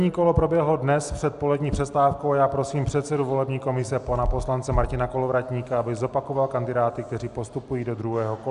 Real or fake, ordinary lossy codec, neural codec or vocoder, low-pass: real; Opus, 64 kbps; none; 10.8 kHz